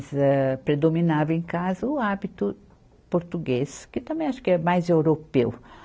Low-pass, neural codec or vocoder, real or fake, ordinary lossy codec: none; none; real; none